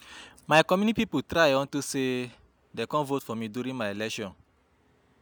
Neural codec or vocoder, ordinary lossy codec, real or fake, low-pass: none; none; real; none